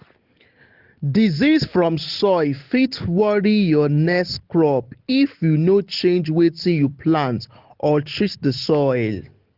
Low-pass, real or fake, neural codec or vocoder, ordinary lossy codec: 5.4 kHz; real; none; Opus, 16 kbps